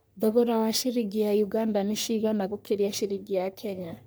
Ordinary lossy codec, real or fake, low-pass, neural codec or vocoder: none; fake; none; codec, 44.1 kHz, 3.4 kbps, Pupu-Codec